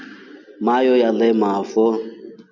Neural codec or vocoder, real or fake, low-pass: none; real; 7.2 kHz